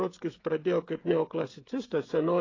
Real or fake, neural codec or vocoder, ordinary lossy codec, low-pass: fake; codec, 16 kHz, 16 kbps, FreqCodec, smaller model; AAC, 32 kbps; 7.2 kHz